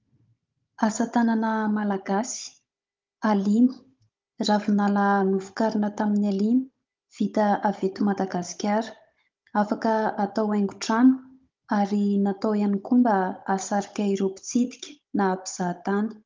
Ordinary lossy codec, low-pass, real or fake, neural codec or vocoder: Opus, 32 kbps; 7.2 kHz; fake; codec, 16 kHz, 16 kbps, FunCodec, trained on Chinese and English, 50 frames a second